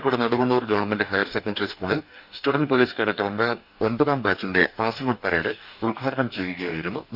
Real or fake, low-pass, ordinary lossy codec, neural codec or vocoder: fake; 5.4 kHz; none; codec, 44.1 kHz, 2.6 kbps, DAC